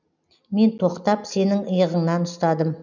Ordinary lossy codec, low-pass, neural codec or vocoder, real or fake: none; 7.2 kHz; none; real